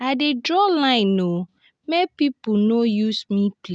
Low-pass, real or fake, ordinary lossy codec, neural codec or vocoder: 9.9 kHz; real; none; none